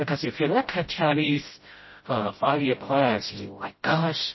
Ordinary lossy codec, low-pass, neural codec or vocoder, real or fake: MP3, 24 kbps; 7.2 kHz; codec, 16 kHz, 0.5 kbps, FreqCodec, smaller model; fake